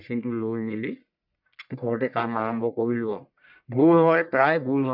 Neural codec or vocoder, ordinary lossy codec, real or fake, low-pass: codec, 44.1 kHz, 1.7 kbps, Pupu-Codec; none; fake; 5.4 kHz